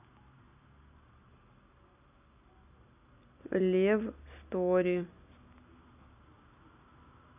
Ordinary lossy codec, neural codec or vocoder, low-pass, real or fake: none; none; 3.6 kHz; real